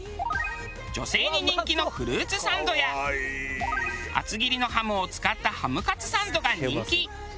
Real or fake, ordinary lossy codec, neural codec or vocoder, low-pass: real; none; none; none